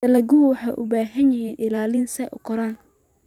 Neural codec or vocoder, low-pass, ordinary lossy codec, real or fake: vocoder, 44.1 kHz, 128 mel bands every 512 samples, BigVGAN v2; 19.8 kHz; none; fake